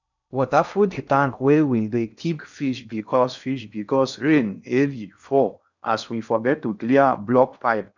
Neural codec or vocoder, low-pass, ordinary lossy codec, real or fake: codec, 16 kHz in and 24 kHz out, 0.6 kbps, FocalCodec, streaming, 2048 codes; 7.2 kHz; none; fake